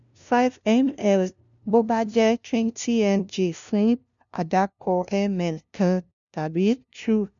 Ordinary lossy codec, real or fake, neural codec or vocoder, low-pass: none; fake; codec, 16 kHz, 0.5 kbps, FunCodec, trained on LibriTTS, 25 frames a second; 7.2 kHz